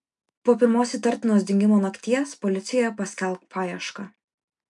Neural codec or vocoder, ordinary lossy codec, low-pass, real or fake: none; MP3, 64 kbps; 10.8 kHz; real